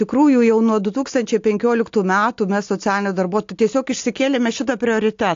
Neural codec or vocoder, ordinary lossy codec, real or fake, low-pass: none; AAC, 48 kbps; real; 7.2 kHz